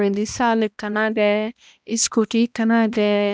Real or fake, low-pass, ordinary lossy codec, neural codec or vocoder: fake; none; none; codec, 16 kHz, 1 kbps, X-Codec, HuBERT features, trained on balanced general audio